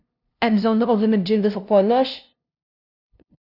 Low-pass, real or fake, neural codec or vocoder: 5.4 kHz; fake; codec, 16 kHz, 0.5 kbps, FunCodec, trained on LibriTTS, 25 frames a second